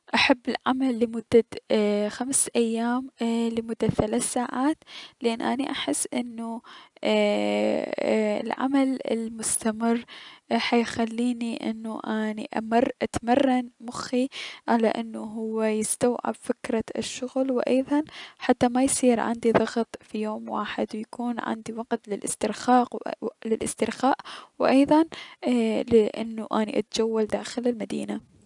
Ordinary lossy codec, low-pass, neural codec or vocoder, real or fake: none; 10.8 kHz; none; real